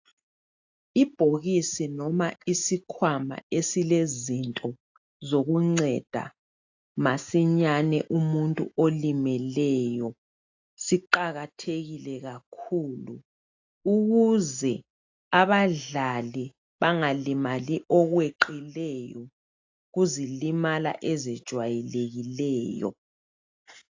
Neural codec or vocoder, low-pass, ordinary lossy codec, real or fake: none; 7.2 kHz; AAC, 48 kbps; real